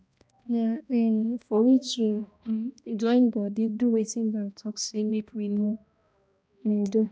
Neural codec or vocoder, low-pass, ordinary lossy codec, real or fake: codec, 16 kHz, 1 kbps, X-Codec, HuBERT features, trained on balanced general audio; none; none; fake